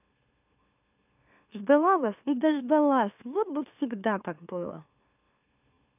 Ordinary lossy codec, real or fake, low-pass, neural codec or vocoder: none; fake; 3.6 kHz; autoencoder, 44.1 kHz, a latent of 192 numbers a frame, MeloTTS